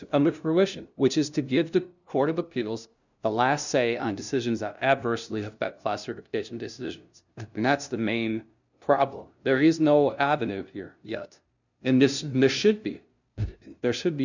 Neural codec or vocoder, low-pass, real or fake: codec, 16 kHz, 0.5 kbps, FunCodec, trained on LibriTTS, 25 frames a second; 7.2 kHz; fake